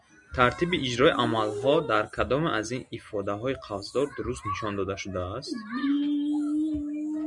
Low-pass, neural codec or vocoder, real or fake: 10.8 kHz; none; real